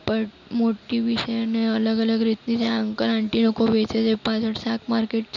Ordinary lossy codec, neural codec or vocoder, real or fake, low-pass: none; none; real; 7.2 kHz